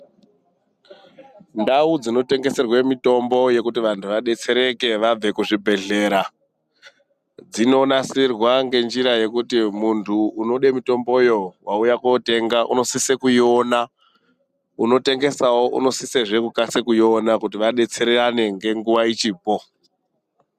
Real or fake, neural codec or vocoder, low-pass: real; none; 14.4 kHz